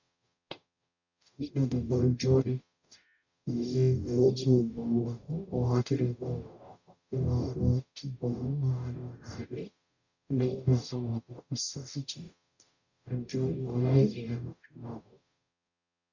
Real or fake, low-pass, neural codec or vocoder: fake; 7.2 kHz; codec, 44.1 kHz, 0.9 kbps, DAC